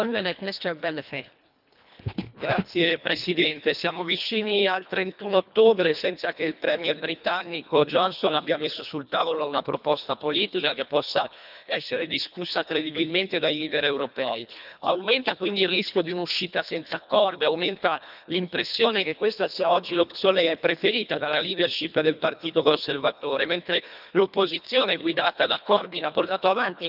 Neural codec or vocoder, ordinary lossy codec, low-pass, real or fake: codec, 24 kHz, 1.5 kbps, HILCodec; none; 5.4 kHz; fake